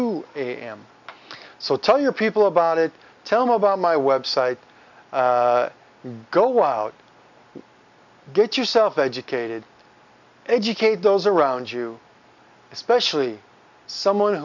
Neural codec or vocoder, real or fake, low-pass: none; real; 7.2 kHz